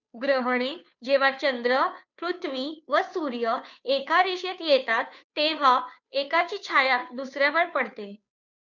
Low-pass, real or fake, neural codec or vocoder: 7.2 kHz; fake; codec, 16 kHz, 2 kbps, FunCodec, trained on Chinese and English, 25 frames a second